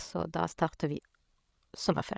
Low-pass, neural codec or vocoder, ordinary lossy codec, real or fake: none; codec, 16 kHz, 16 kbps, FreqCodec, larger model; none; fake